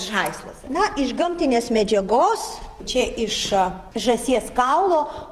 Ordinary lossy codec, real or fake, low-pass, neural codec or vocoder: Opus, 24 kbps; fake; 14.4 kHz; vocoder, 48 kHz, 128 mel bands, Vocos